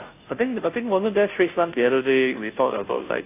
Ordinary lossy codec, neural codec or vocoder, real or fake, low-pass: AAC, 24 kbps; codec, 16 kHz, 0.5 kbps, FunCodec, trained on Chinese and English, 25 frames a second; fake; 3.6 kHz